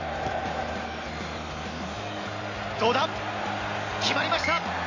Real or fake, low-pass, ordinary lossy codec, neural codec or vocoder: real; 7.2 kHz; AAC, 32 kbps; none